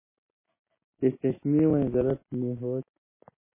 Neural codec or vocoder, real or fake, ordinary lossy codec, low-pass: none; real; MP3, 16 kbps; 3.6 kHz